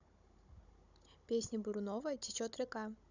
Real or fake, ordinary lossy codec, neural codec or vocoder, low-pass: fake; none; codec, 16 kHz, 16 kbps, FunCodec, trained on Chinese and English, 50 frames a second; 7.2 kHz